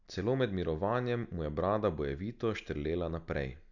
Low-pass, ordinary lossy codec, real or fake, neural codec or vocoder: 7.2 kHz; none; real; none